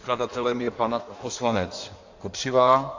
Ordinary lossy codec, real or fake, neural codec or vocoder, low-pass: AAC, 48 kbps; fake; codec, 16 kHz in and 24 kHz out, 1.1 kbps, FireRedTTS-2 codec; 7.2 kHz